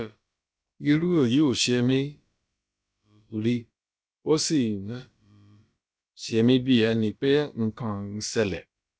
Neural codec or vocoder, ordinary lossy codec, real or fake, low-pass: codec, 16 kHz, about 1 kbps, DyCAST, with the encoder's durations; none; fake; none